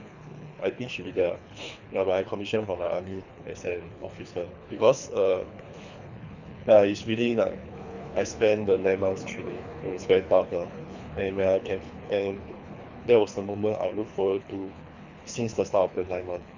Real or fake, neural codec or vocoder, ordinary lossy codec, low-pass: fake; codec, 24 kHz, 3 kbps, HILCodec; none; 7.2 kHz